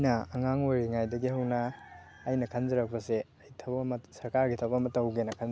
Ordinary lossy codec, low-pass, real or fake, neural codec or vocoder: none; none; real; none